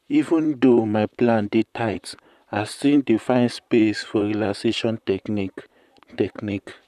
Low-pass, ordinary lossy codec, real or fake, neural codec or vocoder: 14.4 kHz; none; fake; vocoder, 44.1 kHz, 128 mel bands, Pupu-Vocoder